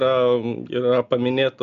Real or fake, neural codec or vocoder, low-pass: real; none; 7.2 kHz